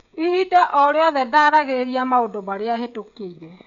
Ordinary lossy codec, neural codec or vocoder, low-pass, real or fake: none; codec, 16 kHz, 16 kbps, FreqCodec, smaller model; 7.2 kHz; fake